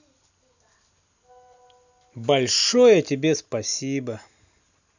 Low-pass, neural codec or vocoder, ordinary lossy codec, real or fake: 7.2 kHz; none; none; real